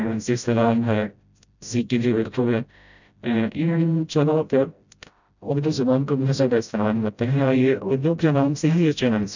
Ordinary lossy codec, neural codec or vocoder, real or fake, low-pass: none; codec, 16 kHz, 0.5 kbps, FreqCodec, smaller model; fake; 7.2 kHz